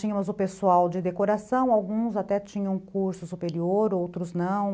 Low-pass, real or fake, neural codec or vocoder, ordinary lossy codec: none; real; none; none